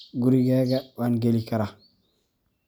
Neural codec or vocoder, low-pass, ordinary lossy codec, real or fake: none; none; none; real